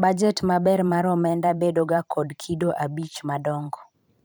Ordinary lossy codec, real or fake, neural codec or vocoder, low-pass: none; fake; vocoder, 44.1 kHz, 128 mel bands every 512 samples, BigVGAN v2; none